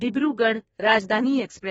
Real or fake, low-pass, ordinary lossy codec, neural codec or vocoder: fake; 14.4 kHz; AAC, 24 kbps; codec, 32 kHz, 1.9 kbps, SNAC